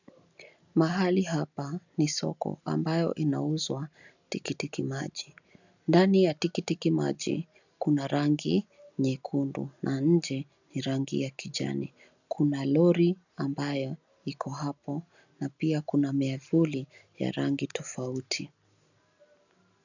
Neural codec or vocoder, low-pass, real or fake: none; 7.2 kHz; real